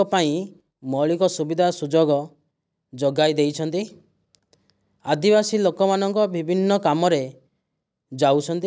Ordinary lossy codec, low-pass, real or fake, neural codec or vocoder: none; none; real; none